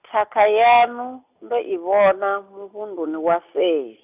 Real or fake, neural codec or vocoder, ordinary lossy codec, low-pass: real; none; none; 3.6 kHz